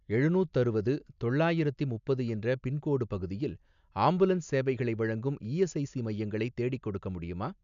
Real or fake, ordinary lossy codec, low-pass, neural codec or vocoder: real; none; 7.2 kHz; none